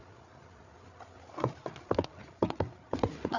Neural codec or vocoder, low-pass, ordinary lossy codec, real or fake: codec, 16 kHz, 8 kbps, FreqCodec, larger model; 7.2 kHz; none; fake